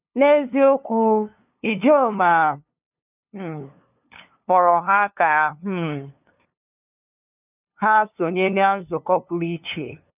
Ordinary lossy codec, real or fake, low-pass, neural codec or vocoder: none; fake; 3.6 kHz; codec, 16 kHz, 2 kbps, FunCodec, trained on LibriTTS, 25 frames a second